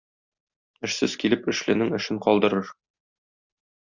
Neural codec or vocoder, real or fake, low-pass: none; real; 7.2 kHz